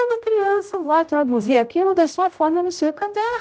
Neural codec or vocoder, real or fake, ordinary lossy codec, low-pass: codec, 16 kHz, 0.5 kbps, X-Codec, HuBERT features, trained on general audio; fake; none; none